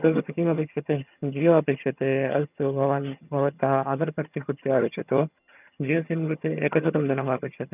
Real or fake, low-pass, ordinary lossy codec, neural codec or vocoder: fake; 3.6 kHz; none; vocoder, 22.05 kHz, 80 mel bands, HiFi-GAN